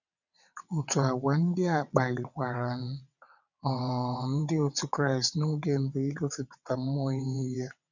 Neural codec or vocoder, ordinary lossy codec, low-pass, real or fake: vocoder, 22.05 kHz, 80 mel bands, WaveNeXt; none; 7.2 kHz; fake